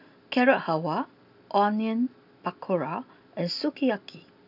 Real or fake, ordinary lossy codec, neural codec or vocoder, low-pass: real; none; none; 5.4 kHz